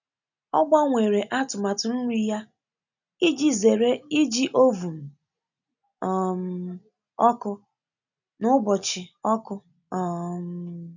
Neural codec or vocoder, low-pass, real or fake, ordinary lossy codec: none; 7.2 kHz; real; none